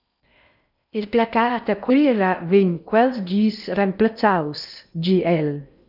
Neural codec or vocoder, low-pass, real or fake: codec, 16 kHz in and 24 kHz out, 0.6 kbps, FocalCodec, streaming, 4096 codes; 5.4 kHz; fake